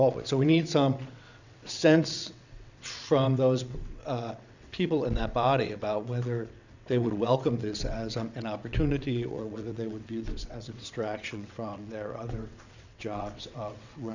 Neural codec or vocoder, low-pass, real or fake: vocoder, 22.05 kHz, 80 mel bands, WaveNeXt; 7.2 kHz; fake